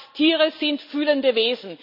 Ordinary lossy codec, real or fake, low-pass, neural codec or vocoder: none; real; 5.4 kHz; none